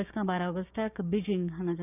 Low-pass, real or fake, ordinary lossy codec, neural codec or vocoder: 3.6 kHz; fake; none; codec, 16 kHz, 6 kbps, DAC